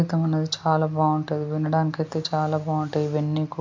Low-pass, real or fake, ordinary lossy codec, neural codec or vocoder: 7.2 kHz; real; MP3, 64 kbps; none